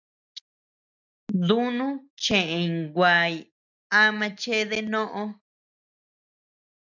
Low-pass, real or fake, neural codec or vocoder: 7.2 kHz; real; none